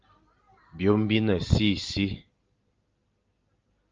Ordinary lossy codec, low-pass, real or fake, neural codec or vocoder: Opus, 24 kbps; 7.2 kHz; real; none